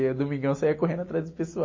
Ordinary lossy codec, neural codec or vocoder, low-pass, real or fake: MP3, 32 kbps; none; 7.2 kHz; real